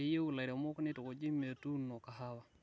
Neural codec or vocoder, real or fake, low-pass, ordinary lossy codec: none; real; none; none